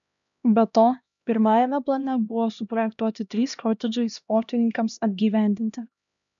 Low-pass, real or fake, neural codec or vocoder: 7.2 kHz; fake; codec, 16 kHz, 1 kbps, X-Codec, HuBERT features, trained on LibriSpeech